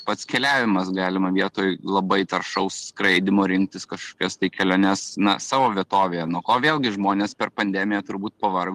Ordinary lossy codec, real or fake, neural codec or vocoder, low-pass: Opus, 24 kbps; real; none; 14.4 kHz